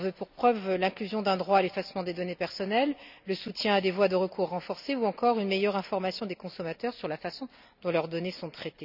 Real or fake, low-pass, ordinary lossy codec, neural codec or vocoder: real; 5.4 kHz; none; none